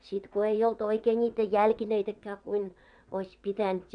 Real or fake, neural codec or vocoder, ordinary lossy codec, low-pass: fake; vocoder, 22.05 kHz, 80 mel bands, WaveNeXt; none; 9.9 kHz